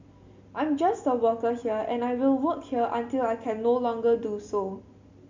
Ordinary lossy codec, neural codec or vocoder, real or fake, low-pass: MP3, 64 kbps; none; real; 7.2 kHz